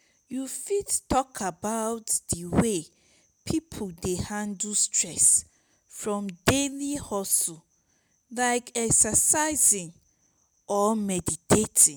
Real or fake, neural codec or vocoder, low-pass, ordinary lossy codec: real; none; none; none